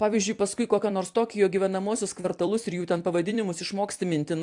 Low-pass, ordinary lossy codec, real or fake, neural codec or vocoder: 10.8 kHz; AAC, 64 kbps; fake; vocoder, 44.1 kHz, 128 mel bands every 256 samples, BigVGAN v2